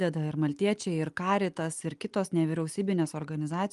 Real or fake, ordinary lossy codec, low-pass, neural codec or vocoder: real; Opus, 32 kbps; 10.8 kHz; none